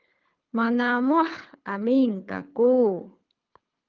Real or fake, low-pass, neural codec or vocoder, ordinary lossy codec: fake; 7.2 kHz; codec, 24 kHz, 3 kbps, HILCodec; Opus, 24 kbps